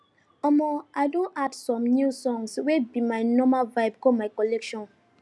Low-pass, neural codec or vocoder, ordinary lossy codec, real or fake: none; none; none; real